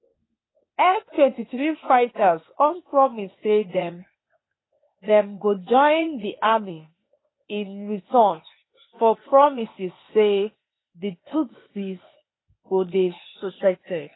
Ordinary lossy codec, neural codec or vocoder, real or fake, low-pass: AAC, 16 kbps; codec, 16 kHz, 0.8 kbps, ZipCodec; fake; 7.2 kHz